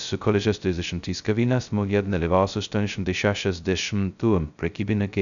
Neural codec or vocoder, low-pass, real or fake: codec, 16 kHz, 0.2 kbps, FocalCodec; 7.2 kHz; fake